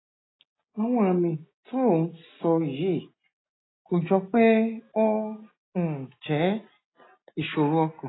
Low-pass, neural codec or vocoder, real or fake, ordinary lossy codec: 7.2 kHz; none; real; AAC, 16 kbps